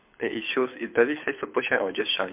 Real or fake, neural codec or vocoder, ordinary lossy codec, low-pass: fake; codec, 24 kHz, 6 kbps, HILCodec; MP3, 32 kbps; 3.6 kHz